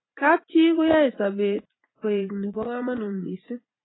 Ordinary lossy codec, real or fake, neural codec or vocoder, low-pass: AAC, 16 kbps; fake; vocoder, 44.1 kHz, 80 mel bands, Vocos; 7.2 kHz